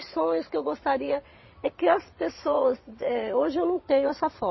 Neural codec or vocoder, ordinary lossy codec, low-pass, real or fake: vocoder, 22.05 kHz, 80 mel bands, WaveNeXt; MP3, 24 kbps; 7.2 kHz; fake